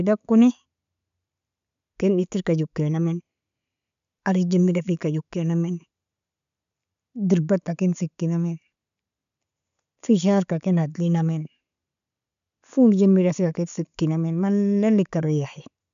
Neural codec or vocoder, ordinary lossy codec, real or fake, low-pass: none; none; real; 7.2 kHz